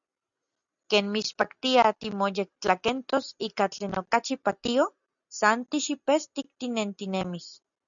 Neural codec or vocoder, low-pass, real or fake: none; 7.2 kHz; real